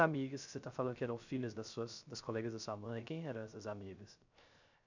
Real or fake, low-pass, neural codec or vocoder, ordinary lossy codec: fake; 7.2 kHz; codec, 16 kHz, 0.3 kbps, FocalCodec; none